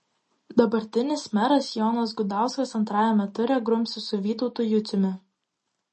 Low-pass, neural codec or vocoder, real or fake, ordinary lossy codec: 10.8 kHz; none; real; MP3, 32 kbps